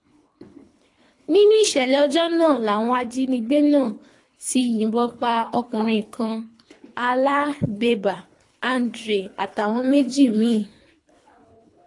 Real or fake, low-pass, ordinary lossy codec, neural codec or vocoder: fake; 10.8 kHz; AAC, 48 kbps; codec, 24 kHz, 3 kbps, HILCodec